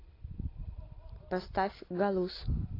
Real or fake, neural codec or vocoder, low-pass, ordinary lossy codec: real; none; 5.4 kHz; AAC, 24 kbps